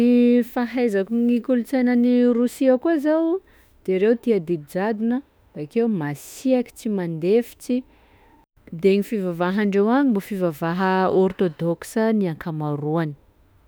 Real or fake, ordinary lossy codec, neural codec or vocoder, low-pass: fake; none; autoencoder, 48 kHz, 32 numbers a frame, DAC-VAE, trained on Japanese speech; none